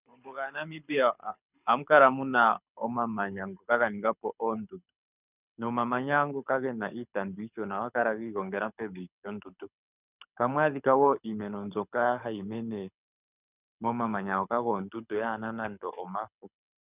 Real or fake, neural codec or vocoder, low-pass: fake; codec, 24 kHz, 6 kbps, HILCodec; 3.6 kHz